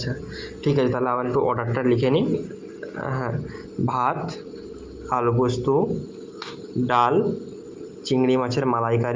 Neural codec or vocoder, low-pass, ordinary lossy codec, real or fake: none; 7.2 kHz; Opus, 32 kbps; real